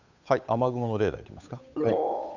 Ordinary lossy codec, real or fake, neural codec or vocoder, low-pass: none; fake; codec, 16 kHz, 8 kbps, FunCodec, trained on Chinese and English, 25 frames a second; 7.2 kHz